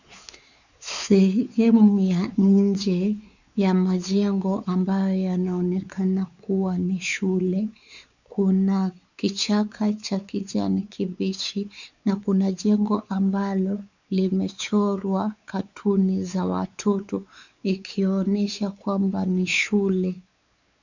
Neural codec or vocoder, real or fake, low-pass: codec, 16 kHz, 4 kbps, X-Codec, WavLM features, trained on Multilingual LibriSpeech; fake; 7.2 kHz